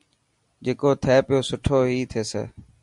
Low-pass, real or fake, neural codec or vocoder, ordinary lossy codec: 10.8 kHz; real; none; MP3, 96 kbps